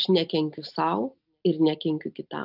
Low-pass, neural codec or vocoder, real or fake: 5.4 kHz; none; real